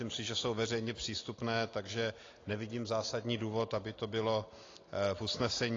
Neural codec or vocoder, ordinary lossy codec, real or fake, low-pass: none; AAC, 32 kbps; real; 7.2 kHz